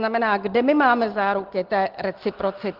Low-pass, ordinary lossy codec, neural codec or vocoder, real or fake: 5.4 kHz; Opus, 16 kbps; none; real